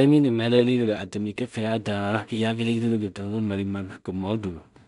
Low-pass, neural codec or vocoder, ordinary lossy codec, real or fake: 10.8 kHz; codec, 16 kHz in and 24 kHz out, 0.4 kbps, LongCat-Audio-Codec, two codebook decoder; none; fake